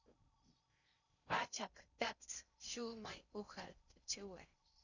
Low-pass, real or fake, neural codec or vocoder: 7.2 kHz; fake; codec, 16 kHz in and 24 kHz out, 0.6 kbps, FocalCodec, streaming, 4096 codes